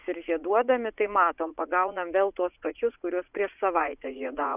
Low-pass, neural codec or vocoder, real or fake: 3.6 kHz; vocoder, 44.1 kHz, 80 mel bands, Vocos; fake